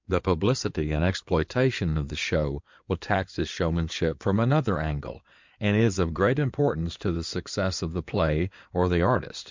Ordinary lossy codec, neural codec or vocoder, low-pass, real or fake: MP3, 64 kbps; codec, 16 kHz in and 24 kHz out, 2.2 kbps, FireRedTTS-2 codec; 7.2 kHz; fake